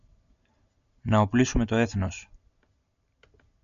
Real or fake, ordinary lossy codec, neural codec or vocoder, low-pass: real; AAC, 96 kbps; none; 7.2 kHz